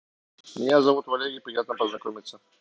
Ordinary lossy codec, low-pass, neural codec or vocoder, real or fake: none; none; none; real